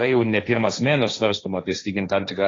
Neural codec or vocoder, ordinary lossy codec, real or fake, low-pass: codec, 16 kHz, 1.1 kbps, Voila-Tokenizer; AAC, 32 kbps; fake; 7.2 kHz